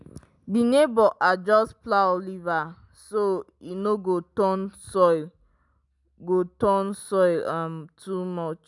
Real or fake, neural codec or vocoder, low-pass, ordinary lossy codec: real; none; 10.8 kHz; none